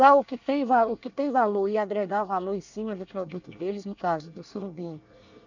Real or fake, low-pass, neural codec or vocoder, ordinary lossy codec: fake; 7.2 kHz; codec, 24 kHz, 1 kbps, SNAC; none